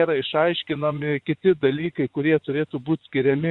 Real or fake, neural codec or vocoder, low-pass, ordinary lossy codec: fake; vocoder, 24 kHz, 100 mel bands, Vocos; 10.8 kHz; Opus, 64 kbps